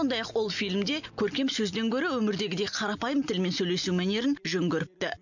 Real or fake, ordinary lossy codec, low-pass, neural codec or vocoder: real; none; 7.2 kHz; none